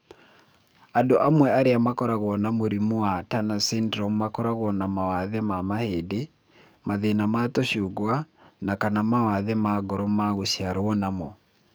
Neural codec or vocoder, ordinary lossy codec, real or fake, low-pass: codec, 44.1 kHz, 7.8 kbps, DAC; none; fake; none